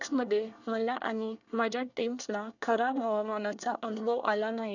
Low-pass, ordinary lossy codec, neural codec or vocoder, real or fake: 7.2 kHz; none; codec, 24 kHz, 1 kbps, SNAC; fake